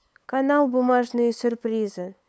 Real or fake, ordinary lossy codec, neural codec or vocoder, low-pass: fake; none; codec, 16 kHz, 8 kbps, FunCodec, trained on LibriTTS, 25 frames a second; none